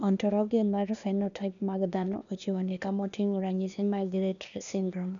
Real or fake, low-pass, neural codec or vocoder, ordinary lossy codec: fake; 7.2 kHz; codec, 16 kHz, 0.8 kbps, ZipCodec; none